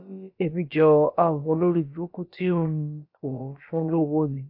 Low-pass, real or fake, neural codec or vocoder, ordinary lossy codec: 5.4 kHz; fake; codec, 16 kHz, about 1 kbps, DyCAST, with the encoder's durations; AAC, 32 kbps